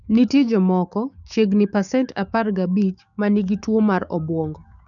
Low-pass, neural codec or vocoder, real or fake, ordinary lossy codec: 7.2 kHz; codec, 16 kHz, 6 kbps, DAC; fake; none